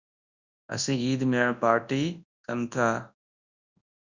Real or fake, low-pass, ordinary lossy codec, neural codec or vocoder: fake; 7.2 kHz; Opus, 64 kbps; codec, 24 kHz, 0.9 kbps, WavTokenizer, large speech release